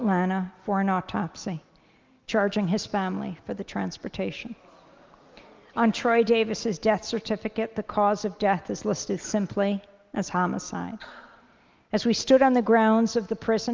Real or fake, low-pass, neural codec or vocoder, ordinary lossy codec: real; 7.2 kHz; none; Opus, 24 kbps